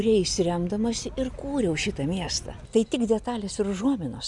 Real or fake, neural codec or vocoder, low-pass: real; none; 10.8 kHz